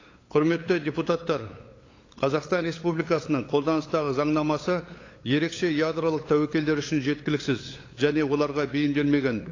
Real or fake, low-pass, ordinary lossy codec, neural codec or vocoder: fake; 7.2 kHz; AAC, 32 kbps; codec, 16 kHz, 8 kbps, FunCodec, trained on LibriTTS, 25 frames a second